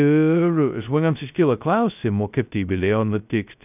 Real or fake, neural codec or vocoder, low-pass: fake; codec, 16 kHz, 0.2 kbps, FocalCodec; 3.6 kHz